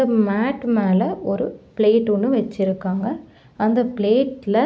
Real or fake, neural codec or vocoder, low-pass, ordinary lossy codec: real; none; none; none